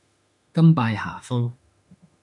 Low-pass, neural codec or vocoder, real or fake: 10.8 kHz; autoencoder, 48 kHz, 32 numbers a frame, DAC-VAE, trained on Japanese speech; fake